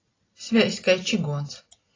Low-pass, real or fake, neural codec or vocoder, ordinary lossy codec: 7.2 kHz; real; none; AAC, 32 kbps